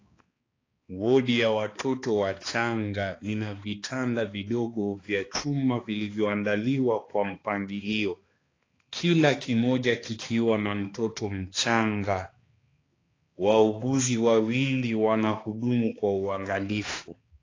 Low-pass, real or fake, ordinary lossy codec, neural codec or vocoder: 7.2 kHz; fake; AAC, 32 kbps; codec, 16 kHz, 2 kbps, X-Codec, HuBERT features, trained on balanced general audio